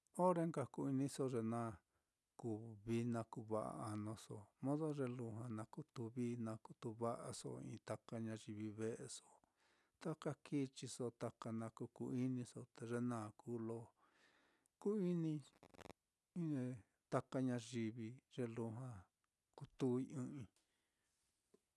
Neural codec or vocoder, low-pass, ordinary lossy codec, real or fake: none; none; none; real